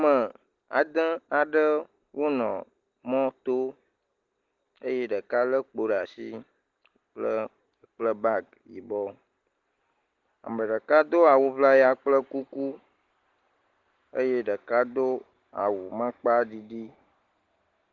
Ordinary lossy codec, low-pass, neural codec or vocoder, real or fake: Opus, 16 kbps; 7.2 kHz; none; real